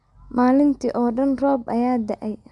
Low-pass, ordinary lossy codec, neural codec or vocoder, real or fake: 10.8 kHz; MP3, 96 kbps; none; real